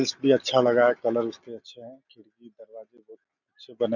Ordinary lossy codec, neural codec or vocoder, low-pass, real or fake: none; none; 7.2 kHz; real